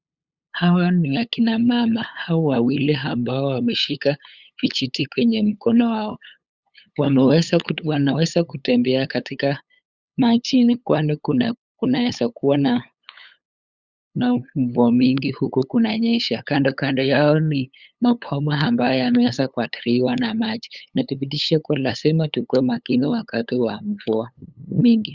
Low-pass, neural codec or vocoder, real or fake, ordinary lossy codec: 7.2 kHz; codec, 16 kHz, 8 kbps, FunCodec, trained on LibriTTS, 25 frames a second; fake; Opus, 64 kbps